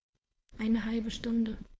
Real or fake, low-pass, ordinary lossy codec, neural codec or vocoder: fake; none; none; codec, 16 kHz, 4.8 kbps, FACodec